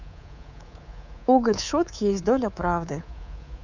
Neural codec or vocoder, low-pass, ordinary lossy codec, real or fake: codec, 24 kHz, 3.1 kbps, DualCodec; 7.2 kHz; none; fake